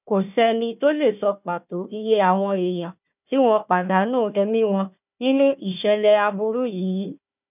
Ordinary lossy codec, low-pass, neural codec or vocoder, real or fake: none; 3.6 kHz; codec, 16 kHz, 1 kbps, FunCodec, trained on Chinese and English, 50 frames a second; fake